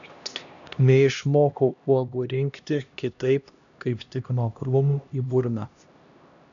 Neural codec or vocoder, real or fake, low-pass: codec, 16 kHz, 1 kbps, X-Codec, HuBERT features, trained on LibriSpeech; fake; 7.2 kHz